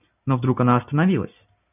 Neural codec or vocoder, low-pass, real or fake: none; 3.6 kHz; real